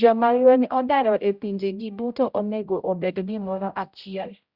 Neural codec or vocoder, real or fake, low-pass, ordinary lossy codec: codec, 16 kHz, 0.5 kbps, X-Codec, HuBERT features, trained on general audio; fake; 5.4 kHz; Opus, 64 kbps